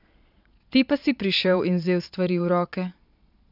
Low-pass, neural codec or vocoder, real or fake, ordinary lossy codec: 5.4 kHz; vocoder, 22.05 kHz, 80 mel bands, Vocos; fake; none